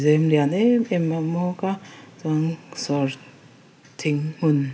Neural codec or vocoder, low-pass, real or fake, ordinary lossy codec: none; none; real; none